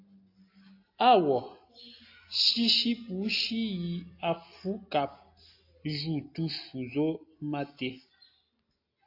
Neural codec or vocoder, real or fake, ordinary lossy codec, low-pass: none; real; AAC, 32 kbps; 5.4 kHz